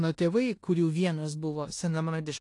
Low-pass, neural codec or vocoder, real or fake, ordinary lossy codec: 10.8 kHz; codec, 16 kHz in and 24 kHz out, 0.9 kbps, LongCat-Audio-Codec, four codebook decoder; fake; AAC, 48 kbps